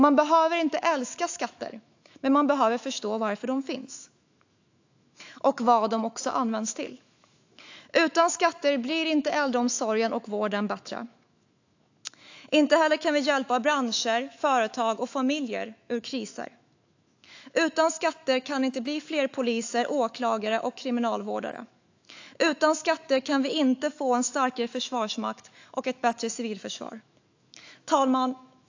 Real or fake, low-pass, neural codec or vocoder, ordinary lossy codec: fake; 7.2 kHz; autoencoder, 48 kHz, 128 numbers a frame, DAC-VAE, trained on Japanese speech; AAC, 48 kbps